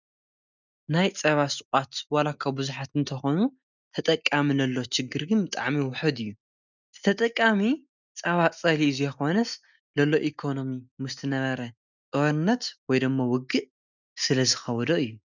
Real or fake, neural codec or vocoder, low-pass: real; none; 7.2 kHz